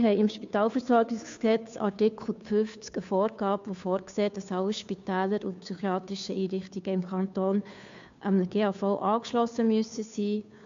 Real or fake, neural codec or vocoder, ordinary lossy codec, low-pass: fake; codec, 16 kHz, 2 kbps, FunCodec, trained on Chinese and English, 25 frames a second; MP3, 64 kbps; 7.2 kHz